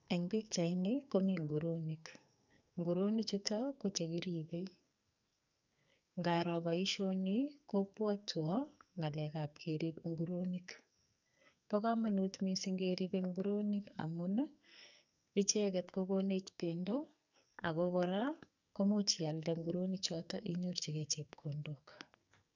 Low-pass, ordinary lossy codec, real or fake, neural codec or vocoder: 7.2 kHz; none; fake; codec, 44.1 kHz, 2.6 kbps, SNAC